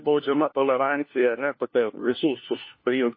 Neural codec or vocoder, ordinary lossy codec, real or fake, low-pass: codec, 16 kHz, 1 kbps, FunCodec, trained on LibriTTS, 50 frames a second; MP3, 24 kbps; fake; 5.4 kHz